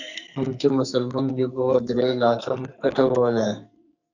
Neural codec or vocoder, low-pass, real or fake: codec, 44.1 kHz, 2.6 kbps, SNAC; 7.2 kHz; fake